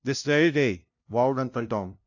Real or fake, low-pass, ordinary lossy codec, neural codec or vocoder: fake; 7.2 kHz; AAC, 48 kbps; codec, 16 kHz, 0.5 kbps, FunCodec, trained on LibriTTS, 25 frames a second